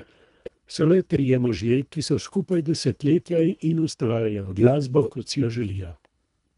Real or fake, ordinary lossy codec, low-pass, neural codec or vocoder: fake; none; 10.8 kHz; codec, 24 kHz, 1.5 kbps, HILCodec